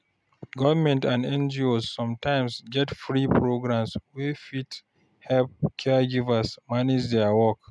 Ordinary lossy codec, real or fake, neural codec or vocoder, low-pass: none; real; none; none